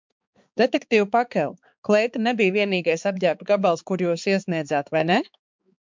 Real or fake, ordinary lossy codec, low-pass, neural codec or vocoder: fake; MP3, 64 kbps; 7.2 kHz; codec, 16 kHz, 4 kbps, X-Codec, HuBERT features, trained on balanced general audio